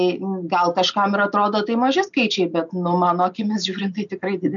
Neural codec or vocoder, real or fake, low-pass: none; real; 7.2 kHz